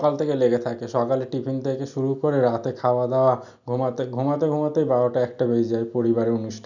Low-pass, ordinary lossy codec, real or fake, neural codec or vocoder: 7.2 kHz; none; real; none